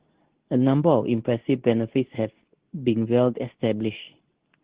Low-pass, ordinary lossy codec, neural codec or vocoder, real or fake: 3.6 kHz; Opus, 16 kbps; codec, 24 kHz, 0.9 kbps, WavTokenizer, medium speech release version 2; fake